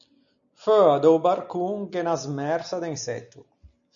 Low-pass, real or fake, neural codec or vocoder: 7.2 kHz; real; none